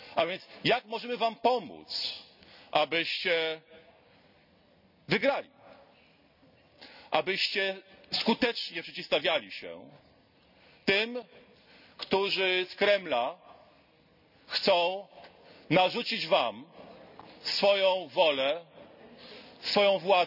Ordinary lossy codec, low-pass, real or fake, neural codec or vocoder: none; 5.4 kHz; real; none